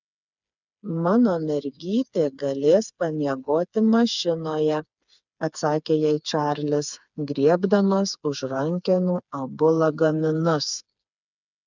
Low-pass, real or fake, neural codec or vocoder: 7.2 kHz; fake; codec, 16 kHz, 4 kbps, FreqCodec, smaller model